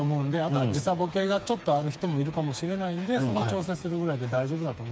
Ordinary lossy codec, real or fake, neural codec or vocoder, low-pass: none; fake; codec, 16 kHz, 4 kbps, FreqCodec, smaller model; none